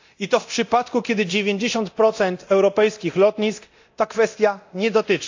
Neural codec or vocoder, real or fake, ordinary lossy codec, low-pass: codec, 24 kHz, 0.9 kbps, DualCodec; fake; AAC, 48 kbps; 7.2 kHz